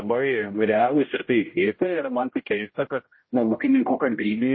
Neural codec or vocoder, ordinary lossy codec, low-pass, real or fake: codec, 16 kHz, 0.5 kbps, X-Codec, HuBERT features, trained on general audio; MP3, 24 kbps; 7.2 kHz; fake